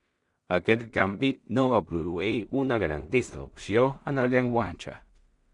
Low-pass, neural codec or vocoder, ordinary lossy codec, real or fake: 10.8 kHz; codec, 16 kHz in and 24 kHz out, 0.4 kbps, LongCat-Audio-Codec, two codebook decoder; Opus, 64 kbps; fake